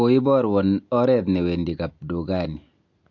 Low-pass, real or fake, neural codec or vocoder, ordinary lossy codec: 7.2 kHz; real; none; MP3, 48 kbps